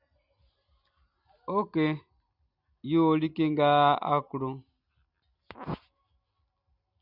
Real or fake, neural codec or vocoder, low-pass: real; none; 5.4 kHz